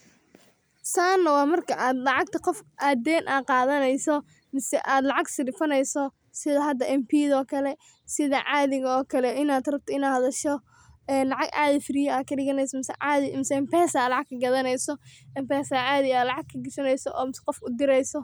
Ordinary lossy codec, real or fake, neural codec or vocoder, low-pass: none; real; none; none